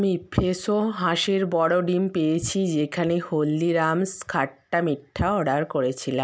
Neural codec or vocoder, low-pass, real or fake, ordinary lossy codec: none; none; real; none